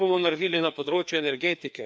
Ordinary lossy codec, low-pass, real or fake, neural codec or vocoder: none; none; fake; codec, 16 kHz, 2 kbps, FreqCodec, larger model